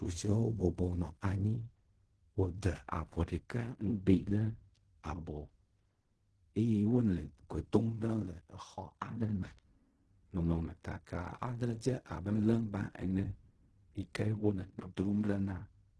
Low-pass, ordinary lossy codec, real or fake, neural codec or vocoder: 10.8 kHz; Opus, 16 kbps; fake; codec, 16 kHz in and 24 kHz out, 0.4 kbps, LongCat-Audio-Codec, fine tuned four codebook decoder